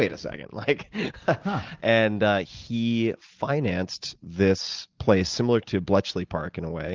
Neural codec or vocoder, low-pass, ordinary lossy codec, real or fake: none; 7.2 kHz; Opus, 32 kbps; real